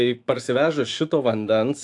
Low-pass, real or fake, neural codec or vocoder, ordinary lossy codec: 10.8 kHz; fake; autoencoder, 48 kHz, 128 numbers a frame, DAC-VAE, trained on Japanese speech; AAC, 64 kbps